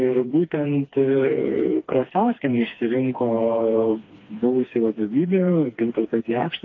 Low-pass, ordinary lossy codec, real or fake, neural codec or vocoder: 7.2 kHz; AAC, 32 kbps; fake; codec, 16 kHz, 2 kbps, FreqCodec, smaller model